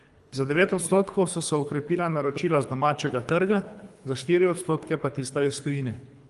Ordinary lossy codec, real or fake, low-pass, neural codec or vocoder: Opus, 32 kbps; fake; 10.8 kHz; codec, 24 kHz, 3 kbps, HILCodec